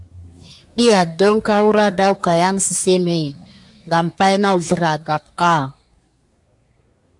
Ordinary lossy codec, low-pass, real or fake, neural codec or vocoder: AAC, 64 kbps; 10.8 kHz; fake; codec, 24 kHz, 1 kbps, SNAC